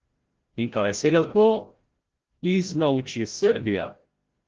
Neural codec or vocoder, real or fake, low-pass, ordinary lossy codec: codec, 16 kHz, 0.5 kbps, FreqCodec, larger model; fake; 7.2 kHz; Opus, 16 kbps